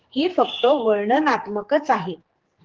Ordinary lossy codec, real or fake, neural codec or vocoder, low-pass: Opus, 16 kbps; fake; codec, 16 kHz, 4 kbps, X-Codec, HuBERT features, trained on general audio; 7.2 kHz